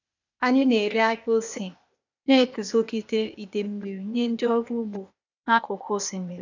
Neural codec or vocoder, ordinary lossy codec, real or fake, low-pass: codec, 16 kHz, 0.8 kbps, ZipCodec; none; fake; 7.2 kHz